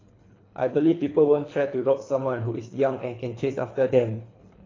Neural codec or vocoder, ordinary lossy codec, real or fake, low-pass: codec, 24 kHz, 3 kbps, HILCodec; AAC, 32 kbps; fake; 7.2 kHz